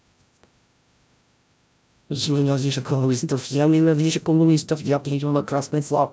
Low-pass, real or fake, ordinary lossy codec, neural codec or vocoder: none; fake; none; codec, 16 kHz, 0.5 kbps, FreqCodec, larger model